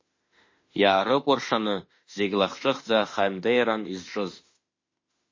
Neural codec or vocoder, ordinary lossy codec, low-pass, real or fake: autoencoder, 48 kHz, 32 numbers a frame, DAC-VAE, trained on Japanese speech; MP3, 32 kbps; 7.2 kHz; fake